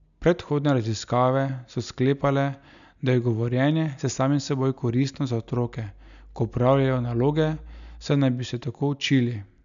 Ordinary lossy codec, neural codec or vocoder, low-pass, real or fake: none; none; 7.2 kHz; real